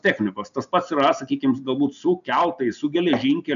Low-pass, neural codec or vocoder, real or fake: 7.2 kHz; none; real